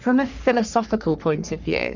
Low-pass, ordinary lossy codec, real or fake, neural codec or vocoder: 7.2 kHz; Opus, 64 kbps; fake; codec, 44.1 kHz, 3.4 kbps, Pupu-Codec